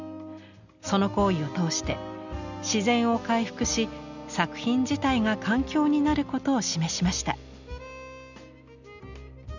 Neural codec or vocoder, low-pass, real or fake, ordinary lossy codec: none; 7.2 kHz; real; none